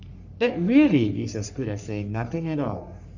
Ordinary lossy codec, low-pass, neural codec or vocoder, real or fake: none; 7.2 kHz; codec, 44.1 kHz, 3.4 kbps, Pupu-Codec; fake